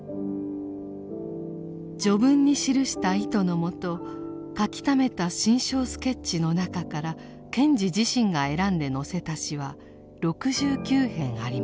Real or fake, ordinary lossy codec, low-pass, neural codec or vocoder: real; none; none; none